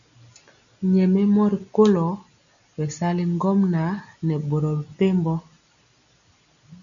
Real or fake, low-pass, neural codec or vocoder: real; 7.2 kHz; none